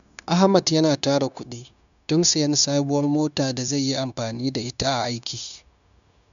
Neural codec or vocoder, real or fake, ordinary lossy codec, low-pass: codec, 16 kHz, 0.9 kbps, LongCat-Audio-Codec; fake; none; 7.2 kHz